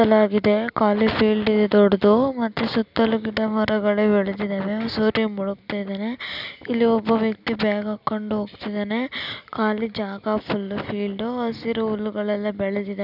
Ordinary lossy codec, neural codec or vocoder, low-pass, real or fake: none; none; 5.4 kHz; real